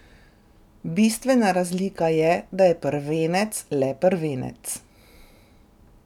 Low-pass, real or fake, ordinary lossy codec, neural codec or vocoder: 19.8 kHz; real; none; none